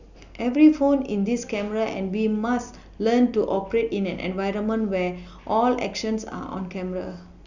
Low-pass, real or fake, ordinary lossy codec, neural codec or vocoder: 7.2 kHz; real; none; none